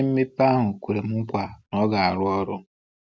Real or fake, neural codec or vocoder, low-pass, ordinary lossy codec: real; none; none; none